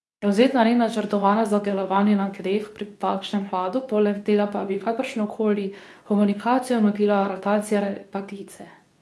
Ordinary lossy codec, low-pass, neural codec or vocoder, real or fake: none; none; codec, 24 kHz, 0.9 kbps, WavTokenizer, medium speech release version 2; fake